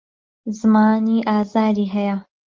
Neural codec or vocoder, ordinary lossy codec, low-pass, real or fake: none; Opus, 32 kbps; 7.2 kHz; real